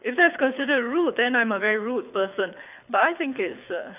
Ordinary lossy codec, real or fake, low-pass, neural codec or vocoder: none; fake; 3.6 kHz; codec, 24 kHz, 6 kbps, HILCodec